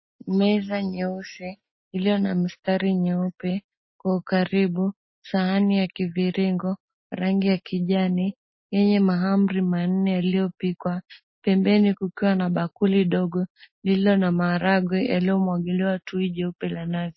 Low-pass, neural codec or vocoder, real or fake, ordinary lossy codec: 7.2 kHz; none; real; MP3, 24 kbps